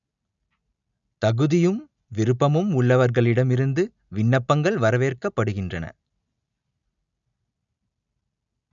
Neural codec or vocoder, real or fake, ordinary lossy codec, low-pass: none; real; none; 7.2 kHz